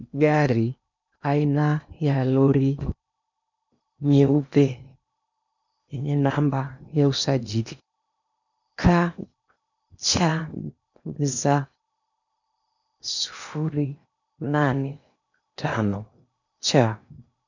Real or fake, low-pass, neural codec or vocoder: fake; 7.2 kHz; codec, 16 kHz in and 24 kHz out, 0.8 kbps, FocalCodec, streaming, 65536 codes